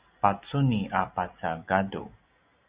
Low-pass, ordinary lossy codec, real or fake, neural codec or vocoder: 3.6 kHz; Opus, 64 kbps; real; none